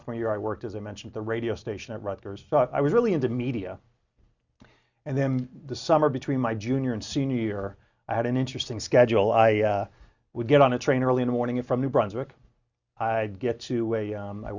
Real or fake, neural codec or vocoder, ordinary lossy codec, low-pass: real; none; Opus, 64 kbps; 7.2 kHz